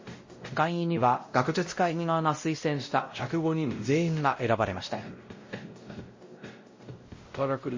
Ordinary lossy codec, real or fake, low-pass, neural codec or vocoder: MP3, 32 kbps; fake; 7.2 kHz; codec, 16 kHz, 0.5 kbps, X-Codec, WavLM features, trained on Multilingual LibriSpeech